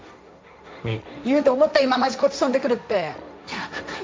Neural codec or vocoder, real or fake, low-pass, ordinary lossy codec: codec, 16 kHz, 1.1 kbps, Voila-Tokenizer; fake; none; none